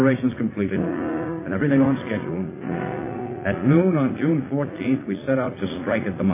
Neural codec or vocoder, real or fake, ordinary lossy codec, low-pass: vocoder, 44.1 kHz, 80 mel bands, Vocos; fake; MP3, 16 kbps; 3.6 kHz